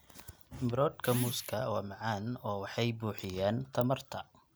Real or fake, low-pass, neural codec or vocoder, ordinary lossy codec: real; none; none; none